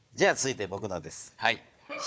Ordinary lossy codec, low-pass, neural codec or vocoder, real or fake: none; none; codec, 16 kHz, 4 kbps, FunCodec, trained on Chinese and English, 50 frames a second; fake